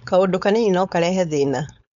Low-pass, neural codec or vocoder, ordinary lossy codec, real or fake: 7.2 kHz; codec, 16 kHz, 4.8 kbps, FACodec; none; fake